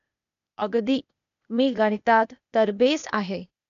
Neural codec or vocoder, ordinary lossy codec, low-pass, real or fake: codec, 16 kHz, 0.8 kbps, ZipCodec; none; 7.2 kHz; fake